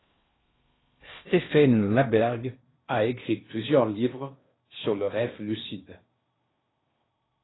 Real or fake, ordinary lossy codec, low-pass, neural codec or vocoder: fake; AAC, 16 kbps; 7.2 kHz; codec, 16 kHz in and 24 kHz out, 0.6 kbps, FocalCodec, streaming, 4096 codes